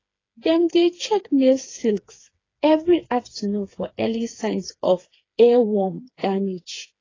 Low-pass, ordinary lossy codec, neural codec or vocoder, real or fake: 7.2 kHz; AAC, 32 kbps; codec, 16 kHz, 4 kbps, FreqCodec, smaller model; fake